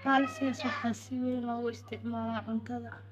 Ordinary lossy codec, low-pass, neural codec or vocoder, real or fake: none; 14.4 kHz; codec, 32 kHz, 1.9 kbps, SNAC; fake